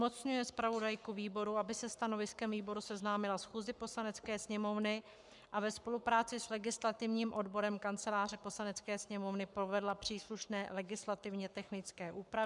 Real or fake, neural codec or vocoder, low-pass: fake; codec, 44.1 kHz, 7.8 kbps, Pupu-Codec; 10.8 kHz